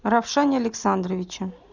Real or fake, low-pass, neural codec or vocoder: real; 7.2 kHz; none